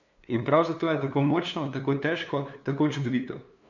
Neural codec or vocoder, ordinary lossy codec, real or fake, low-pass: codec, 16 kHz, 2 kbps, FunCodec, trained on LibriTTS, 25 frames a second; none; fake; 7.2 kHz